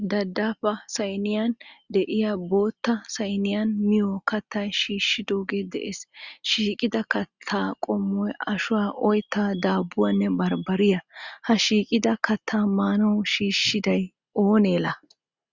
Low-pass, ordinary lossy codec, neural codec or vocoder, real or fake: 7.2 kHz; Opus, 64 kbps; none; real